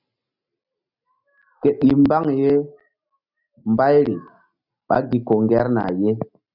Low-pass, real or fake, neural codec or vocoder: 5.4 kHz; real; none